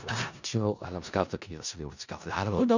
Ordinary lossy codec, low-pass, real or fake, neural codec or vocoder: none; 7.2 kHz; fake; codec, 16 kHz in and 24 kHz out, 0.4 kbps, LongCat-Audio-Codec, four codebook decoder